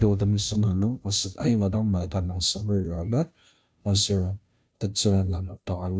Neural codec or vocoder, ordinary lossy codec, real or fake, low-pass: codec, 16 kHz, 0.5 kbps, FunCodec, trained on Chinese and English, 25 frames a second; none; fake; none